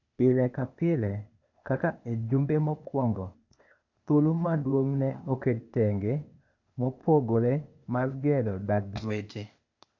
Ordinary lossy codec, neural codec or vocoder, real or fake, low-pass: none; codec, 16 kHz, 0.8 kbps, ZipCodec; fake; 7.2 kHz